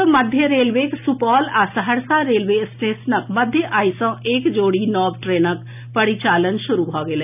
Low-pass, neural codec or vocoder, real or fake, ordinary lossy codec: 3.6 kHz; none; real; none